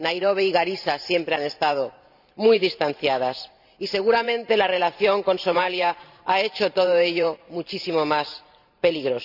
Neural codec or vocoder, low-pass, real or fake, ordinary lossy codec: vocoder, 44.1 kHz, 128 mel bands every 512 samples, BigVGAN v2; 5.4 kHz; fake; none